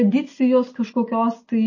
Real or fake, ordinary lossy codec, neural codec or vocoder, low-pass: real; MP3, 32 kbps; none; 7.2 kHz